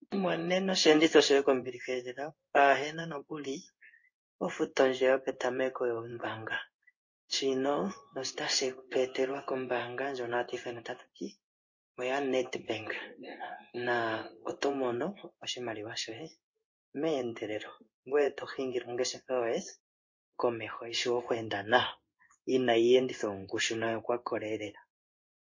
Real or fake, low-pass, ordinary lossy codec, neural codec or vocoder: fake; 7.2 kHz; MP3, 32 kbps; codec, 16 kHz in and 24 kHz out, 1 kbps, XY-Tokenizer